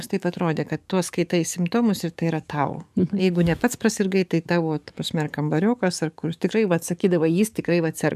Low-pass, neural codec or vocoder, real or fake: 14.4 kHz; codec, 44.1 kHz, 7.8 kbps, DAC; fake